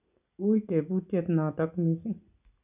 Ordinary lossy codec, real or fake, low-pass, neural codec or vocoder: none; real; 3.6 kHz; none